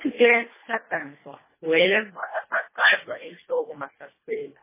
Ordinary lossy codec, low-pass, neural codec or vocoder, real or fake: MP3, 16 kbps; 3.6 kHz; codec, 24 kHz, 1.5 kbps, HILCodec; fake